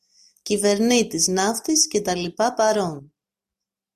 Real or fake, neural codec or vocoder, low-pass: real; none; 14.4 kHz